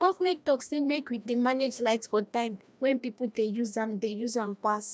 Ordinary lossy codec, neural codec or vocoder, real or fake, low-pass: none; codec, 16 kHz, 1 kbps, FreqCodec, larger model; fake; none